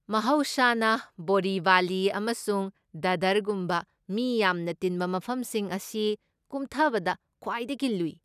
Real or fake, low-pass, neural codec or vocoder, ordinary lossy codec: real; 14.4 kHz; none; none